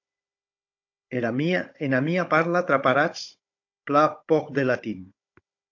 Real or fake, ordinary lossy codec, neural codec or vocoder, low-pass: fake; AAC, 48 kbps; codec, 16 kHz, 16 kbps, FunCodec, trained on Chinese and English, 50 frames a second; 7.2 kHz